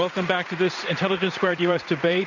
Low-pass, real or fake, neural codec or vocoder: 7.2 kHz; fake; vocoder, 44.1 kHz, 80 mel bands, Vocos